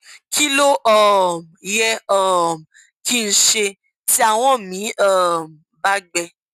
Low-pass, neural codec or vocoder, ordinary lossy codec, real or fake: 14.4 kHz; none; none; real